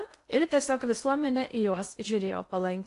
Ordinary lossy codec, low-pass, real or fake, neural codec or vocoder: AAC, 48 kbps; 10.8 kHz; fake; codec, 16 kHz in and 24 kHz out, 0.6 kbps, FocalCodec, streaming, 2048 codes